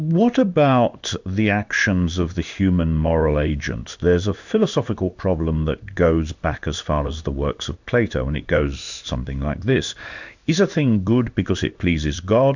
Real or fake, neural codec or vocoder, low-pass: fake; codec, 16 kHz in and 24 kHz out, 1 kbps, XY-Tokenizer; 7.2 kHz